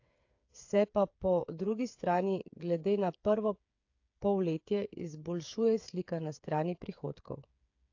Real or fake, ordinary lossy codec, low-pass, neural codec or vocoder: fake; AAC, 48 kbps; 7.2 kHz; codec, 16 kHz, 8 kbps, FreqCodec, smaller model